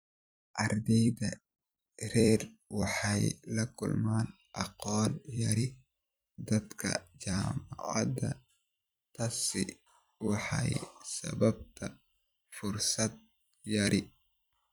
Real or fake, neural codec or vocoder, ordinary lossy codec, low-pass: fake; vocoder, 44.1 kHz, 128 mel bands every 256 samples, BigVGAN v2; none; none